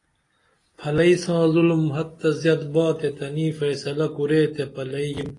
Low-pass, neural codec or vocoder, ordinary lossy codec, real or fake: 10.8 kHz; none; AAC, 32 kbps; real